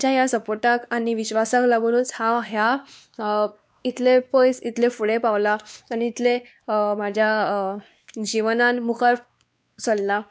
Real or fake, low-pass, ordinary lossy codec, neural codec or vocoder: fake; none; none; codec, 16 kHz, 2 kbps, X-Codec, WavLM features, trained on Multilingual LibriSpeech